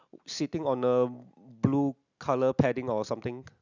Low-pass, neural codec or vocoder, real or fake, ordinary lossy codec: 7.2 kHz; none; real; none